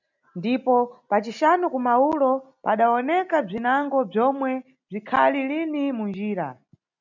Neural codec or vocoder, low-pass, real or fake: none; 7.2 kHz; real